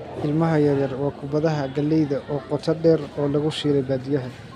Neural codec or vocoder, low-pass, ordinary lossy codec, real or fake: none; 14.4 kHz; none; real